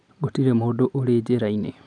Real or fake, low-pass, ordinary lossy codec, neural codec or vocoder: real; 9.9 kHz; none; none